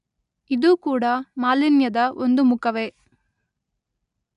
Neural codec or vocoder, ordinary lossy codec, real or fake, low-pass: none; none; real; 10.8 kHz